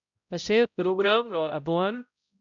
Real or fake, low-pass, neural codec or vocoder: fake; 7.2 kHz; codec, 16 kHz, 0.5 kbps, X-Codec, HuBERT features, trained on balanced general audio